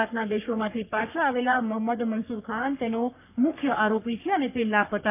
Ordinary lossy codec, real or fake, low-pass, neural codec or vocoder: none; fake; 3.6 kHz; codec, 44.1 kHz, 3.4 kbps, Pupu-Codec